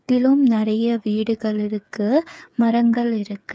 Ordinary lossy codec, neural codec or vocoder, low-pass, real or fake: none; codec, 16 kHz, 8 kbps, FreqCodec, smaller model; none; fake